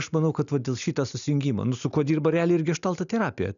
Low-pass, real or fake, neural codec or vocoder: 7.2 kHz; real; none